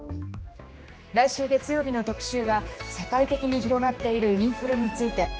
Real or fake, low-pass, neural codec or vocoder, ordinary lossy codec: fake; none; codec, 16 kHz, 2 kbps, X-Codec, HuBERT features, trained on general audio; none